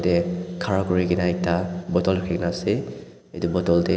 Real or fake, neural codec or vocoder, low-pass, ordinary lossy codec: real; none; none; none